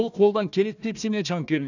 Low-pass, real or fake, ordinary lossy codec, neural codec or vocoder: 7.2 kHz; fake; none; codec, 16 kHz, 1 kbps, FunCodec, trained on Chinese and English, 50 frames a second